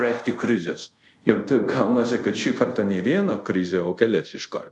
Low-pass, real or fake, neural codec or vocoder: 10.8 kHz; fake; codec, 24 kHz, 0.5 kbps, DualCodec